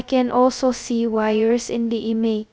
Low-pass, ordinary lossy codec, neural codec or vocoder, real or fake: none; none; codec, 16 kHz, 0.2 kbps, FocalCodec; fake